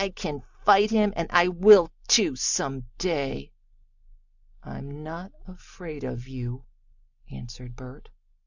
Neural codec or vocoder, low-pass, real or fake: none; 7.2 kHz; real